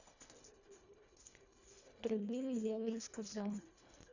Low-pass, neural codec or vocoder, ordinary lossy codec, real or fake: 7.2 kHz; codec, 24 kHz, 1.5 kbps, HILCodec; none; fake